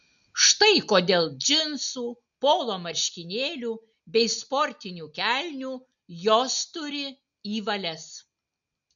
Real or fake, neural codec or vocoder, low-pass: real; none; 7.2 kHz